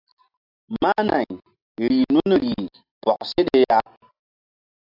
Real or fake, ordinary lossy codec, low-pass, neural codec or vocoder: real; AAC, 48 kbps; 5.4 kHz; none